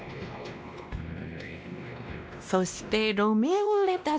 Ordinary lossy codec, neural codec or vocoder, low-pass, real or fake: none; codec, 16 kHz, 1 kbps, X-Codec, WavLM features, trained on Multilingual LibriSpeech; none; fake